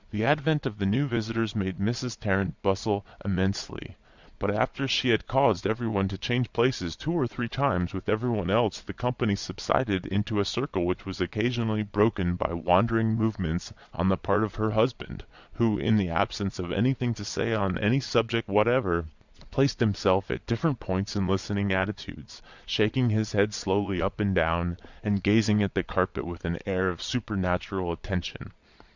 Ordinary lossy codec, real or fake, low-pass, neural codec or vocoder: Opus, 64 kbps; fake; 7.2 kHz; vocoder, 22.05 kHz, 80 mel bands, Vocos